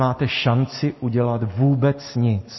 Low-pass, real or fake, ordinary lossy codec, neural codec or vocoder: 7.2 kHz; real; MP3, 24 kbps; none